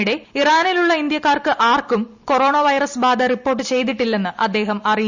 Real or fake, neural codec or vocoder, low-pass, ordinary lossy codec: real; none; 7.2 kHz; Opus, 64 kbps